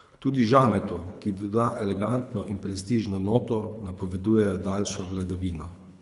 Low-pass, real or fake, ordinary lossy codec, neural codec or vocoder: 10.8 kHz; fake; none; codec, 24 kHz, 3 kbps, HILCodec